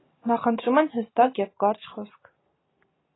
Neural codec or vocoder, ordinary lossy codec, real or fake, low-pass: none; AAC, 16 kbps; real; 7.2 kHz